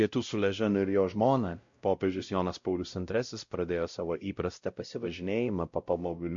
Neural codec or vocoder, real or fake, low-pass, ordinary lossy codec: codec, 16 kHz, 0.5 kbps, X-Codec, WavLM features, trained on Multilingual LibriSpeech; fake; 7.2 kHz; MP3, 48 kbps